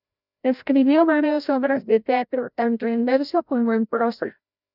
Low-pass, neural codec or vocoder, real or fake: 5.4 kHz; codec, 16 kHz, 0.5 kbps, FreqCodec, larger model; fake